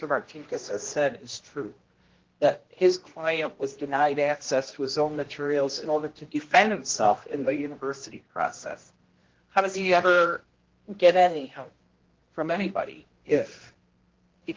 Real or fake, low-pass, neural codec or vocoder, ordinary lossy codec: fake; 7.2 kHz; codec, 16 kHz, 1 kbps, X-Codec, HuBERT features, trained on general audio; Opus, 16 kbps